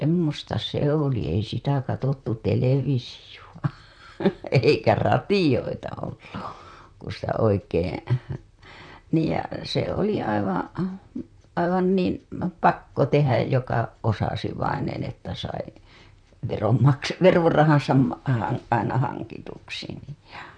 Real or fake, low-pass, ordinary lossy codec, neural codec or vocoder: fake; 9.9 kHz; none; vocoder, 44.1 kHz, 128 mel bands, Pupu-Vocoder